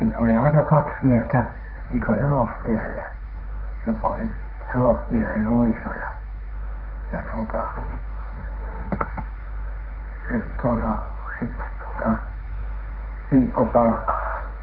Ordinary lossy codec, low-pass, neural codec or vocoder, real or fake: none; 5.4 kHz; codec, 16 kHz, 1.1 kbps, Voila-Tokenizer; fake